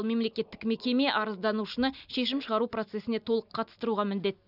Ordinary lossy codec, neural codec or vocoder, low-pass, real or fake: none; none; 5.4 kHz; real